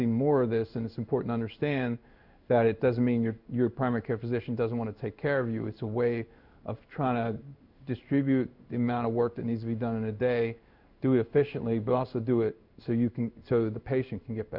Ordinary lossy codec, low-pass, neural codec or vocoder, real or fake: Opus, 64 kbps; 5.4 kHz; codec, 16 kHz in and 24 kHz out, 1 kbps, XY-Tokenizer; fake